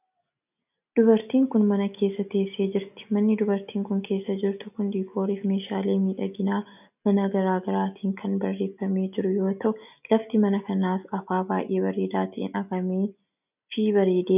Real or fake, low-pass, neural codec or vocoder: real; 3.6 kHz; none